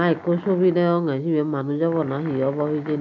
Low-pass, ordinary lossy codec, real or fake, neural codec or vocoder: 7.2 kHz; none; real; none